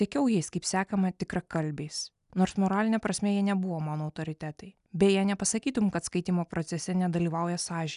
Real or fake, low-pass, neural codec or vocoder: real; 10.8 kHz; none